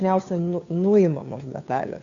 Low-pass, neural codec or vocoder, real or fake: 7.2 kHz; codec, 16 kHz, 2 kbps, FunCodec, trained on Chinese and English, 25 frames a second; fake